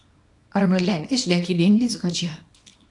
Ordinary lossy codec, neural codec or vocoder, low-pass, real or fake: AAC, 48 kbps; codec, 24 kHz, 0.9 kbps, WavTokenizer, small release; 10.8 kHz; fake